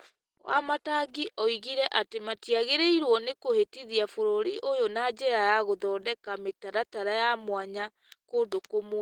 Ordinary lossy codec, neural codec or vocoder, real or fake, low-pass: Opus, 16 kbps; none; real; 19.8 kHz